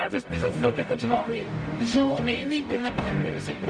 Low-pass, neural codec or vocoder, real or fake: 9.9 kHz; codec, 44.1 kHz, 0.9 kbps, DAC; fake